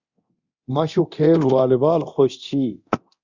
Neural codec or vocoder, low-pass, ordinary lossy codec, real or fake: codec, 24 kHz, 0.9 kbps, DualCodec; 7.2 kHz; Opus, 64 kbps; fake